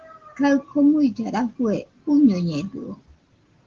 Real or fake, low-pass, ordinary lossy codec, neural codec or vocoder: real; 7.2 kHz; Opus, 16 kbps; none